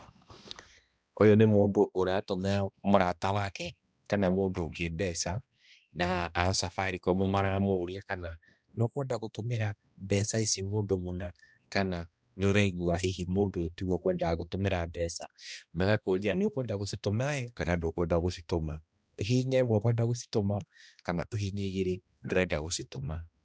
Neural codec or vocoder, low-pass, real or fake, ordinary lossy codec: codec, 16 kHz, 1 kbps, X-Codec, HuBERT features, trained on balanced general audio; none; fake; none